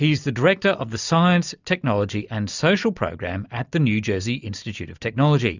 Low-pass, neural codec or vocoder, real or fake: 7.2 kHz; none; real